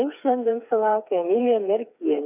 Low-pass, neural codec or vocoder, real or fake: 3.6 kHz; codec, 16 kHz, 4 kbps, FreqCodec, smaller model; fake